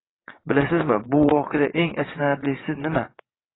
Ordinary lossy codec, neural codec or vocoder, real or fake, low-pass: AAC, 16 kbps; vocoder, 22.05 kHz, 80 mel bands, WaveNeXt; fake; 7.2 kHz